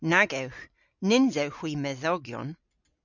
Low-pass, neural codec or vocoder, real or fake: 7.2 kHz; none; real